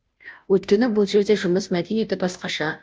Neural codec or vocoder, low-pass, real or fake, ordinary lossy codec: codec, 16 kHz, 0.5 kbps, FunCodec, trained on Chinese and English, 25 frames a second; none; fake; none